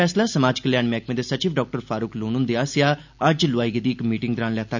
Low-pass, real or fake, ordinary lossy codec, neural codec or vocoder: 7.2 kHz; real; none; none